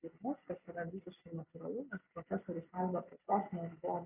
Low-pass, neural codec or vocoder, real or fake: 3.6 kHz; none; real